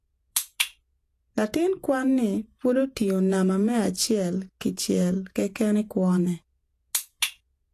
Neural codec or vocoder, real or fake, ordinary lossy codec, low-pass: vocoder, 48 kHz, 128 mel bands, Vocos; fake; AAC, 64 kbps; 14.4 kHz